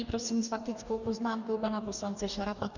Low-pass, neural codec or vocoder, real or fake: 7.2 kHz; codec, 44.1 kHz, 2.6 kbps, DAC; fake